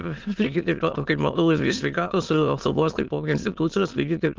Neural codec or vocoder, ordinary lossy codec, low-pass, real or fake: autoencoder, 22.05 kHz, a latent of 192 numbers a frame, VITS, trained on many speakers; Opus, 32 kbps; 7.2 kHz; fake